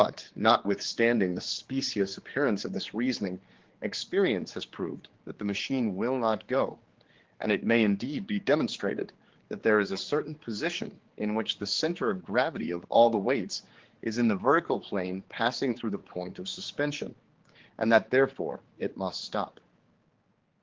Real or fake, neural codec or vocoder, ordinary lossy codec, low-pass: fake; codec, 16 kHz, 4 kbps, X-Codec, HuBERT features, trained on general audio; Opus, 16 kbps; 7.2 kHz